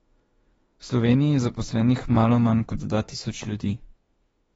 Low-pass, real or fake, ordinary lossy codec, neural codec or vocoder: 19.8 kHz; fake; AAC, 24 kbps; autoencoder, 48 kHz, 32 numbers a frame, DAC-VAE, trained on Japanese speech